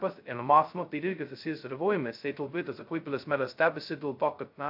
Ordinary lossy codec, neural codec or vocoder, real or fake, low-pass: AAC, 48 kbps; codec, 16 kHz, 0.2 kbps, FocalCodec; fake; 5.4 kHz